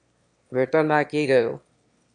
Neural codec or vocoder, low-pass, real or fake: autoencoder, 22.05 kHz, a latent of 192 numbers a frame, VITS, trained on one speaker; 9.9 kHz; fake